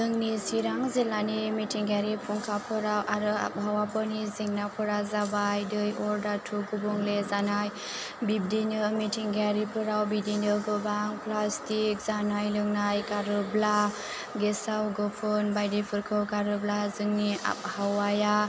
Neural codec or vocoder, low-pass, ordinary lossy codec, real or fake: none; none; none; real